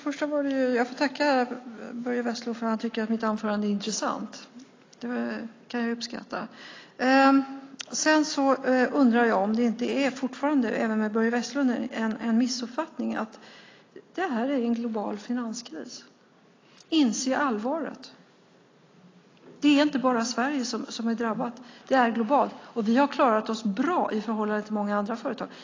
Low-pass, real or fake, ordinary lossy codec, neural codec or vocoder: 7.2 kHz; real; AAC, 32 kbps; none